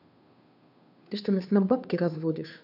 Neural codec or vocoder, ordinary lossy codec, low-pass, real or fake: codec, 16 kHz, 2 kbps, FunCodec, trained on Chinese and English, 25 frames a second; none; 5.4 kHz; fake